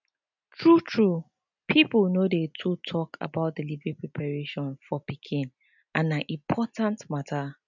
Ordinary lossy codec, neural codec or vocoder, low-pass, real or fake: none; none; 7.2 kHz; real